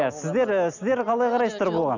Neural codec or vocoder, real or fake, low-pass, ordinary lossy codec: none; real; 7.2 kHz; none